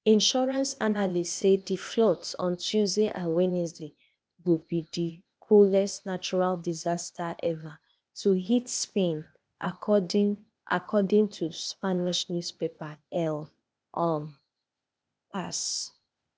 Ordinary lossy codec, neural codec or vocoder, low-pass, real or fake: none; codec, 16 kHz, 0.8 kbps, ZipCodec; none; fake